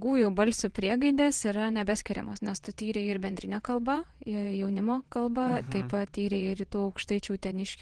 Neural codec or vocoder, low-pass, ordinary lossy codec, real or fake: vocoder, 22.05 kHz, 80 mel bands, WaveNeXt; 9.9 kHz; Opus, 16 kbps; fake